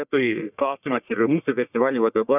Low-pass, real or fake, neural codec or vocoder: 3.6 kHz; fake; codec, 44.1 kHz, 1.7 kbps, Pupu-Codec